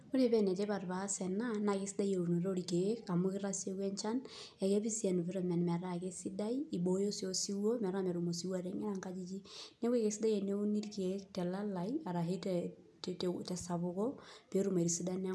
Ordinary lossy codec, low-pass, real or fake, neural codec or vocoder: none; none; real; none